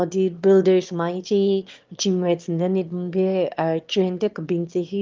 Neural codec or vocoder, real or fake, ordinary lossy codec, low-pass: autoencoder, 22.05 kHz, a latent of 192 numbers a frame, VITS, trained on one speaker; fake; Opus, 32 kbps; 7.2 kHz